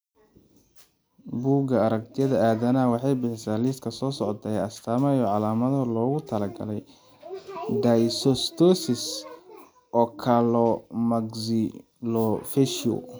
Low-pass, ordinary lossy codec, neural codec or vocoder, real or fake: none; none; none; real